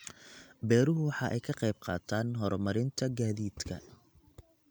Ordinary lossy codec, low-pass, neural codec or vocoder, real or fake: none; none; none; real